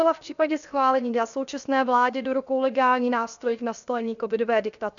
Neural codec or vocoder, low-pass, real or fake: codec, 16 kHz, 0.7 kbps, FocalCodec; 7.2 kHz; fake